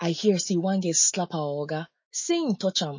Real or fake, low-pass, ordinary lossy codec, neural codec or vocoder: fake; 7.2 kHz; MP3, 32 kbps; autoencoder, 48 kHz, 128 numbers a frame, DAC-VAE, trained on Japanese speech